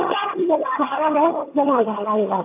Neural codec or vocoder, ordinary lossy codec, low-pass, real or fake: vocoder, 22.05 kHz, 80 mel bands, HiFi-GAN; AAC, 24 kbps; 3.6 kHz; fake